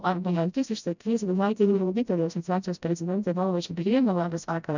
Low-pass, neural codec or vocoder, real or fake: 7.2 kHz; codec, 16 kHz, 0.5 kbps, FreqCodec, smaller model; fake